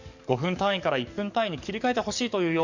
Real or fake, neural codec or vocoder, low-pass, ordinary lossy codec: fake; codec, 44.1 kHz, 7.8 kbps, DAC; 7.2 kHz; Opus, 64 kbps